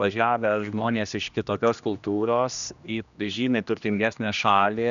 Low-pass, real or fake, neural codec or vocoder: 7.2 kHz; fake; codec, 16 kHz, 1 kbps, X-Codec, HuBERT features, trained on general audio